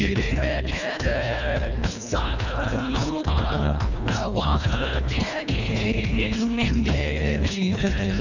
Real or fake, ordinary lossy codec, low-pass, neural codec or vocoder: fake; none; 7.2 kHz; codec, 24 kHz, 1.5 kbps, HILCodec